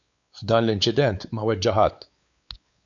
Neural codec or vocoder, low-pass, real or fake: codec, 16 kHz, 4 kbps, X-Codec, WavLM features, trained on Multilingual LibriSpeech; 7.2 kHz; fake